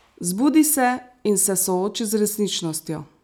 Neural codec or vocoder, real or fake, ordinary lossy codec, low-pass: none; real; none; none